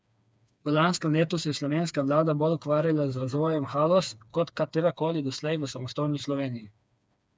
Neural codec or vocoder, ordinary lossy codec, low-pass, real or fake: codec, 16 kHz, 4 kbps, FreqCodec, smaller model; none; none; fake